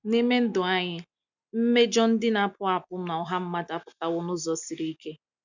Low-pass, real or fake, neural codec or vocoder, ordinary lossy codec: 7.2 kHz; real; none; none